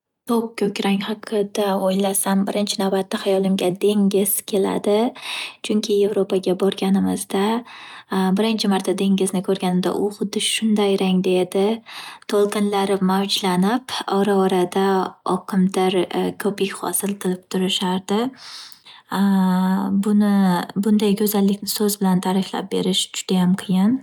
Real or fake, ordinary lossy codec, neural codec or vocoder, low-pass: real; none; none; 19.8 kHz